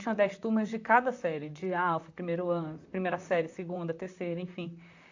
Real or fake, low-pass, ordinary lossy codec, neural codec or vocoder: fake; 7.2 kHz; AAC, 48 kbps; vocoder, 44.1 kHz, 128 mel bands, Pupu-Vocoder